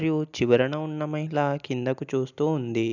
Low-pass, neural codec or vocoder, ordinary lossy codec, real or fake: 7.2 kHz; none; none; real